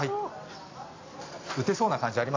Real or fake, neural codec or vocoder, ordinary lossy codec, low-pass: fake; vocoder, 44.1 kHz, 128 mel bands every 256 samples, BigVGAN v2; AAC, 32 kbps; 7.2 kHz